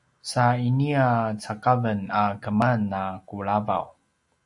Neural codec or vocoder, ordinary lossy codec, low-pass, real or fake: none; AAC, 64 kbps; 10.8 kHz; real